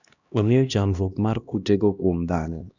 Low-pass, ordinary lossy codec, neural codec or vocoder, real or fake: 7.2 kHz; none; codec, 16 kHz, 1 kbps, X-Codec, HuBERT features, trained on LibriSpeech; fake